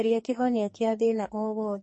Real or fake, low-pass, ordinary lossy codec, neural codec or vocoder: fake; 10.8 kHz; MP3, 32 kbps; codec, 44.1 kHz, 2.6 kbps, SNAC